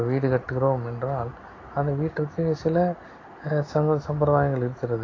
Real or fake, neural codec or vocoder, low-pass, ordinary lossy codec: real; none; 7.2 kHz; AAC, 32 kbps